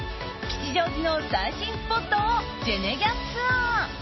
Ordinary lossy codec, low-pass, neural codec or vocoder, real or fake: MP3, 24 kbps; 7.2 kHz; none; real